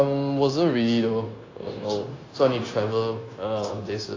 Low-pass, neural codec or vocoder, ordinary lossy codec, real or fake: 7.2 kHz; codec, 16 kHz, 0.9 kbps, LongCat-Audio-Codec; AAC, 32 kbps; fake